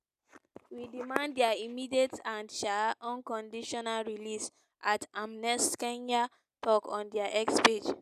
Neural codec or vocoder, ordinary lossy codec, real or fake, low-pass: none; none; real; 14.4 kHz